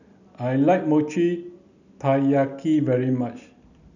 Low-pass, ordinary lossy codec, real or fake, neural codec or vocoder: 7.2 kHz; none; real; none